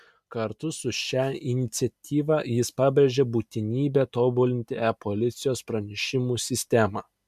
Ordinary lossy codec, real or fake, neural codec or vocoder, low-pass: MP3, 96 kbps; real; none; 14.4 kHz